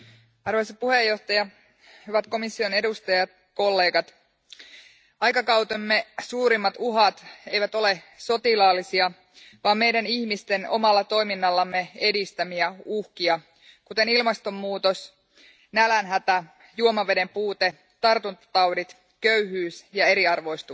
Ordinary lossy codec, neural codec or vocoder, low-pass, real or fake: none; none; none; real